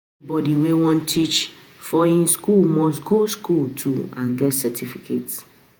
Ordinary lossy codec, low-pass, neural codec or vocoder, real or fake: none; none; vocoder, 48 kHz, 128 mel bands, Vocos; fake